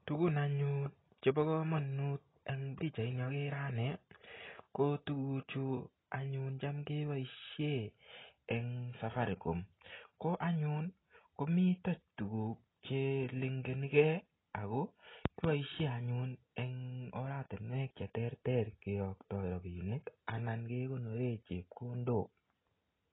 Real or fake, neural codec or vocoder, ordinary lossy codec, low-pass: real; none; AAC, 16 kbps; 7.2 kHz